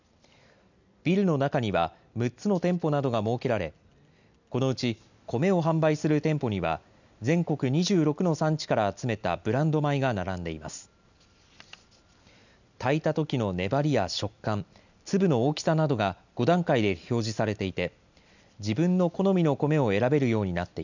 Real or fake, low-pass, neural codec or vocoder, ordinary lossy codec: real; 7.2 kHz; none; none